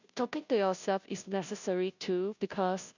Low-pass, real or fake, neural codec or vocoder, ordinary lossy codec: 7.2 kHz; fake; codec, 16 kHz, 0.5 kbps, FunCodec, trained on Chinese and English, 25 frames a second; none